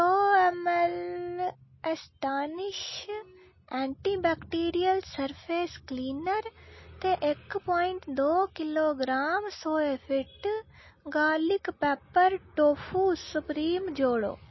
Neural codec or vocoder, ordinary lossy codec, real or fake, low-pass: none; MP3, 24 kbps; real; 7.2 kHz